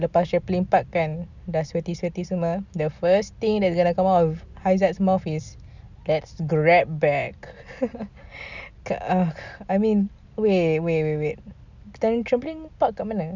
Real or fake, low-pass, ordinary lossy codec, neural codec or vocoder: real; 7.2 kHz; none; none